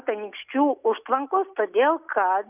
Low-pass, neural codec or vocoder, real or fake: 3.6 kHz; none; real